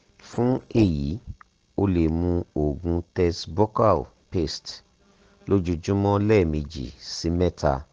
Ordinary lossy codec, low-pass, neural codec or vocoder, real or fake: Opus, 16 kbps; 7.2 kHz; none; real